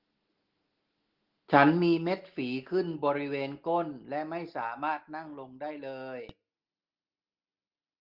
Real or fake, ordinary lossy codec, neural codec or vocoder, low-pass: real; Opus, 16 kbps; none; 5.4 kHz